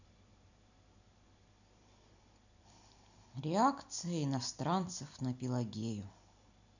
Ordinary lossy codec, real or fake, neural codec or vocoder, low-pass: none; real; none; 7.2 kHz